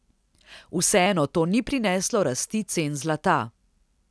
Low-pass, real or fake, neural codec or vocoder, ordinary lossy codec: none; real; none; none